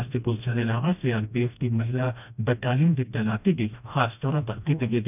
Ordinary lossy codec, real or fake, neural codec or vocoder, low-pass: none; fake; codec, 16 kHz, 1 kbps, FreqCodec, smaller model; 3.6 kHz